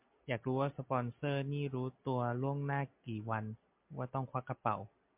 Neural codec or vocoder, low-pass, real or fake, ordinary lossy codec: none; 3.6 kHz; real; MP3, 32 kbps